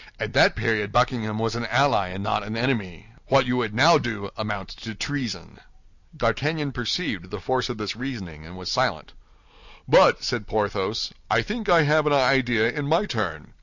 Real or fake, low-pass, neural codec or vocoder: real; 7.2 kHz; none